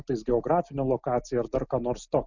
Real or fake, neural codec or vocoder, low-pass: real; none; 7.2 kHz